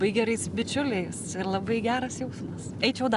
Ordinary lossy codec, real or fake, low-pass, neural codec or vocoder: Opus, 64 kbps; real; 10.8 kHz; none